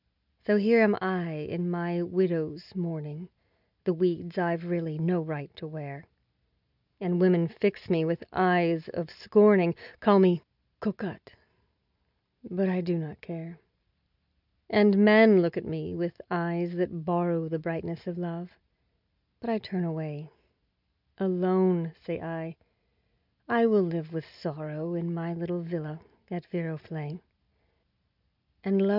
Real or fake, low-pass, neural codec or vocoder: real; 5.4 kHz; none